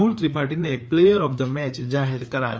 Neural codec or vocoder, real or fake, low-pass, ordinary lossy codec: codec, 16 kHz, 4 kbps, FreqCodec, larger model; fake; none; none